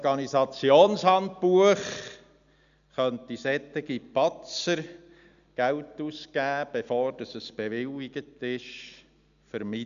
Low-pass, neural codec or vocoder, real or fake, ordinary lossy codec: 7.2 kHz; none; real; none